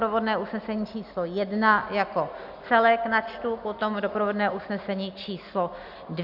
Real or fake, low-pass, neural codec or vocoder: real; 5.4 kHz; none